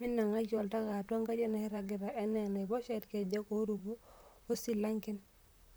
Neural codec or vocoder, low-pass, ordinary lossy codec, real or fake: vocoder, 44.1 kHz, 128 mel bands, Pupu-Vocoder; none; none; fake